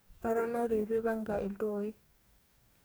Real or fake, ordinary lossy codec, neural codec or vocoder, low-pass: fake; none; codec, 44.1 kHz, 2.6 kbps, DAC; none